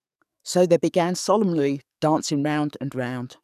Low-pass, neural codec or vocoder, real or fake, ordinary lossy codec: 14.4 kHz; codec, 44.1 kHz, 7.8 kbps, DAC; fake; none